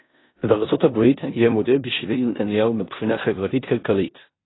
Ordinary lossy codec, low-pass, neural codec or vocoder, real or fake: AAC, 16 kbps; 7.2 kHz; codec, 16 kHz in and 24 kHz out, 0.9 kbps, LongCat-Audio-Codec, four codebook decoder; fake